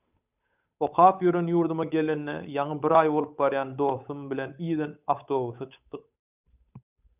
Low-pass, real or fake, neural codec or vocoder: 3.6 kHz; fake; codec, 16 kHz, 8 kbps, FunCodec, trained on Chinese and English, 25 frames a second